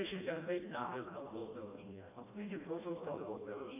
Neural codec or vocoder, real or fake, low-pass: codec, 16 kHz, 1 kbps, FreqCodec, smaller model; fake; 3.6 kHz